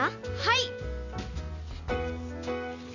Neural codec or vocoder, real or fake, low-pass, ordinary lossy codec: none; real; 7.2 kHz; none